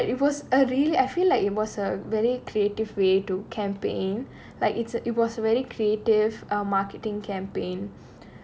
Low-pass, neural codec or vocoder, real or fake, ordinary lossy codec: none; none; real; none